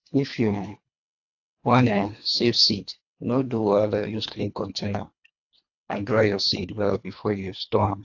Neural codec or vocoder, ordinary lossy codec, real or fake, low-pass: codec, 24 kHz, 1.5 kbps, HILCodec; AAC, 48 kbps; fake; 7.2 kHz